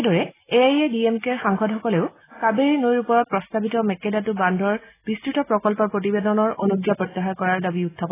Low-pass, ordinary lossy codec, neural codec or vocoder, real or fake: 3.6 kHz; AAC, 16 kbps; none; real